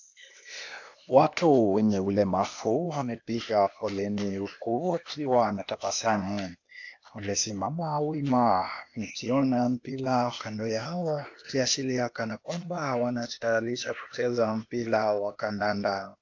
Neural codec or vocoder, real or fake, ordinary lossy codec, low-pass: codec, 16 kHz, 0.8 kbps, ZipCodec; fake; AAC, 48 kbps; 7.2 kHz